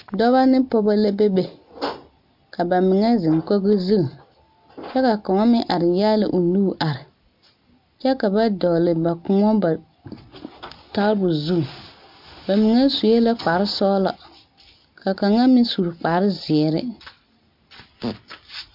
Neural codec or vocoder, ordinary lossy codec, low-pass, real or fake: none; MP3, 48 kbps; 5.4 kHz; real